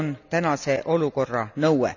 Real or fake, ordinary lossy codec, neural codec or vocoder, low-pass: real; none; none; 7.2 kHz